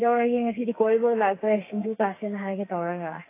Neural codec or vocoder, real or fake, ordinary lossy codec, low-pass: codec, 32 kHz, 1.9 kbps, SNAC; fake; AAC, 24 kbps; 3.6 kHz